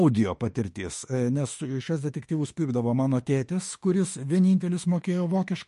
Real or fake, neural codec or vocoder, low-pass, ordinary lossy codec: fake; autoencoder, 48 kHz, 32 numbers a frame, DAC-VAE, trained on Japanese speech; 14.4 kHz; MP3, 48 kbps